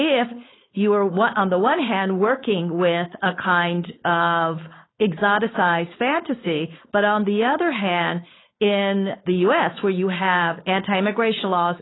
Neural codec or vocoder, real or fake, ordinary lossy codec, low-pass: codec, 16 kHz, 4.8 kbps, FACodec; fake; AAC, 16 kbps; 7.2 kHz